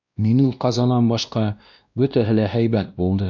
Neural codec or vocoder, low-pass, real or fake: codec, 16 kHz, 1 kbps, X-Codec, WavLM features, trained on Multilingual LibriSpeech; 7.2 kHz; fake